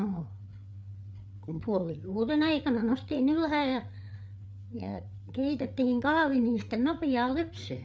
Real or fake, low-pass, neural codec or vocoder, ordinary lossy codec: fake; none; codec, 16 kHz, 8 kbps, FreqCodec, larger model; none